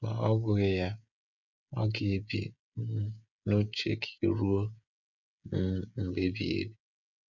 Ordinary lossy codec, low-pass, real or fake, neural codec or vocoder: none; 7.2 kHz; fake; codec, 16 kHz, 16 kbps, FreqCodec, smaller model